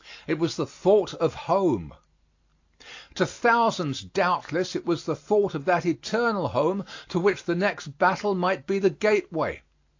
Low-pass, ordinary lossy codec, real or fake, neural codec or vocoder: 7.2 kHz; AAC, 48 kbps; real; none